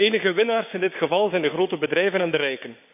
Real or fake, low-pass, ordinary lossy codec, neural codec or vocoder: fake; 3.6 kHz; none; codec, 16 kHz, 6 kbps, DAC